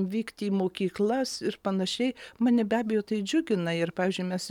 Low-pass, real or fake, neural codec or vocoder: 19.8 kHz; real; none